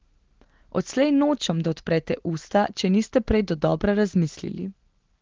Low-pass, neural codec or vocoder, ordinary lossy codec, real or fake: 7.2 kHz; none; Opus, 16 kbps; real